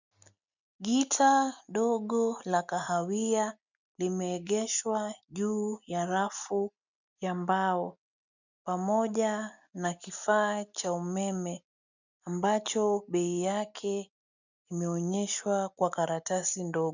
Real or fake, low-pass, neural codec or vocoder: real; 7.2 kHz; none